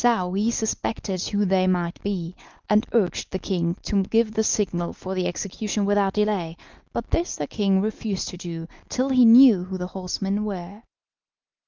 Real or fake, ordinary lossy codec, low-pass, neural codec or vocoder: real; Opus, 24 kbps; 7.2 kHz; none